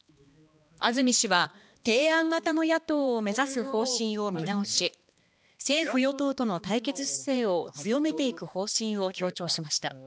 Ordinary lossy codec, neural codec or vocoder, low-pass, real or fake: none; codec, 16 kHz, 2 kbps, X-Codec, HuBERT features, trained on balanced general audio; none; fake